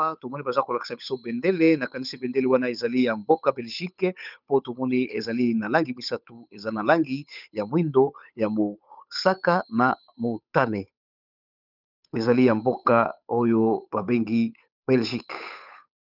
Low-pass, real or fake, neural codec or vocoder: 5.4 kHz; fake; codec, 16 kHz, 8 kbps, FunCodec, trained on Chinese and English, 25 frames a second